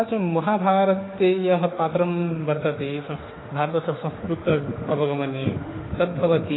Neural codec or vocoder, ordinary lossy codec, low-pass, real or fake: autoencoder, 48 kHz, 32 numbers a frame, DAC-VAE, trained on Japanese speech; AAC, 16 kbps; 7.2 kHz; fake